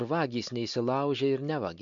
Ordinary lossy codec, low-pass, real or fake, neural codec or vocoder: MP3, 64 kbps; 7.2 kHz; real; none